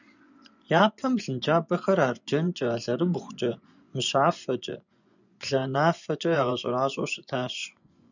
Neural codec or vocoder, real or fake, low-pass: vocoder, 24 kHz, 100 mel bands, Vocos; fake; 7.2 kHz